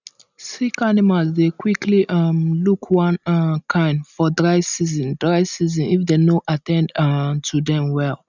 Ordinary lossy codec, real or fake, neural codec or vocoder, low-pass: none; real; none; 7.2 kHz